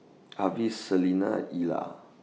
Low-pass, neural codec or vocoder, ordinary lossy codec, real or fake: none; none; none; real